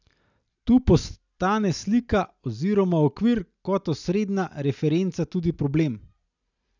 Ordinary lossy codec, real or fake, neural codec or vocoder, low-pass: none; real; none; 7.2 kHz